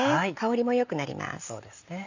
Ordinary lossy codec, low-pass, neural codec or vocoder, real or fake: none; 7.2 kHz; none; real